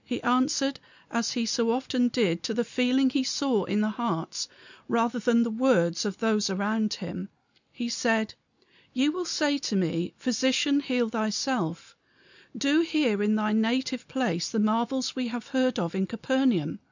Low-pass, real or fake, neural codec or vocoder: 7.2 kHz; real; none